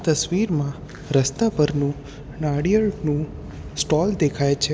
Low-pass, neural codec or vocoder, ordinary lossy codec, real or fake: none; none; none; real